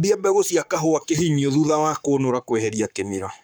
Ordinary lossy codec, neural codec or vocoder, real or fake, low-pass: none; codec, 44.1 kHz, 7.8 kbps, Pupu-Codec; fake; none